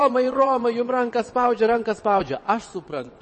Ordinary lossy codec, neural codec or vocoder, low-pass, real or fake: MP3, 32 kbps; vocoder, 22.05 kHz, 80 mel bands, WaveNeXt; 9.9 kHz; fake